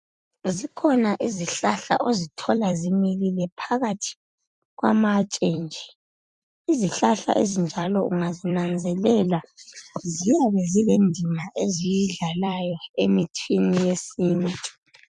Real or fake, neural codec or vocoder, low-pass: fake; vocoder, 44.1 kHz, 128 mel bands every 256 samples, BigVGAN v2; 10.8 kHz